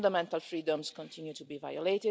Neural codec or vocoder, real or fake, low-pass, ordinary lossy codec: none; real; none; none